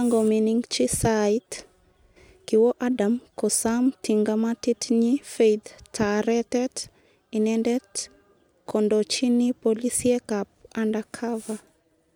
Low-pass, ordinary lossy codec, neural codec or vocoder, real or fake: none; none; none; real